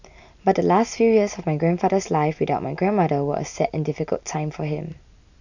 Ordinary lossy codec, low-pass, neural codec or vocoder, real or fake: none; 7.2 kHz; none; real